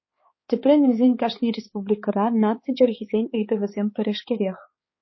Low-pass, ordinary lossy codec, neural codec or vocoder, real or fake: 7.2 kHz; MP3, 24 kbps; codec, 16 kHz, 4 kbps, X-Codec, HuBERT features, trained on balanced general audio; fake